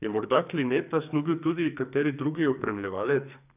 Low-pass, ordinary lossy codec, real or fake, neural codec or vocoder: 3.6 kHz; none; fake; codec, 44.1 kHz, 2.6 kbps, SNAC